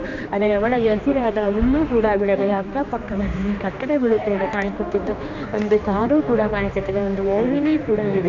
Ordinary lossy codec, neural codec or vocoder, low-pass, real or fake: none; codec, 16 kHz, 2 kbps, X-Codec, HuBERT features, trained on general audio; 7.2 kHz; fake